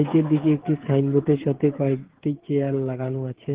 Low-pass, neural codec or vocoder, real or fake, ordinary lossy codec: 3.6 kHz; codec, 16 kHz, 8 kbps, FreqCodec, smaller model; fake; Opus, 16 kbps